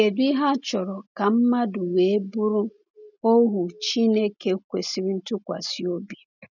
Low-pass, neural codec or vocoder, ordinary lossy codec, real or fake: 7.2 kHz; none; none; real